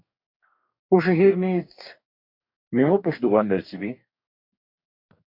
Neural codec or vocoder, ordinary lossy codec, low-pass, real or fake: codec, 44.1 kHz, 2.6 kbps, DAC; MP3, 48 kbps; 5.4 kHz; fake